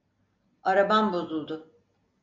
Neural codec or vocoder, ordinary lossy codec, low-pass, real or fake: none; MP3, 64 kbps; 7.2 kHz; real